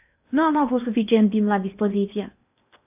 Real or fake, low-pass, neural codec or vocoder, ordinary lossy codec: fake; 3.6 kHz; codec, 16 kHz in and 24 kHz out, 0.8 kbps, FocalCodec, streaming, 65536 codes; AAC, 32 kbps